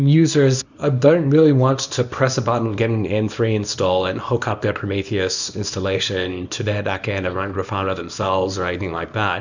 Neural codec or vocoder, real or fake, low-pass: codec, 24 kHz, 0.9 kbps, WavTokenizer, small release; fake; 7.2 kHz